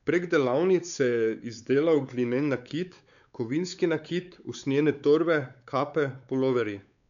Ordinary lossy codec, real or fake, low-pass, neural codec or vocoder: none; fake; 7.2 kHz; codec, 16 kHz, 4 kbps, X-Codec, WavLM features, trained on Multilingual LibriSpeech